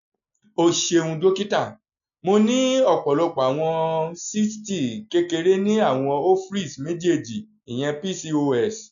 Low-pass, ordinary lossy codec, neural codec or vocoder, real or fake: 7.2 kHz; none; none; real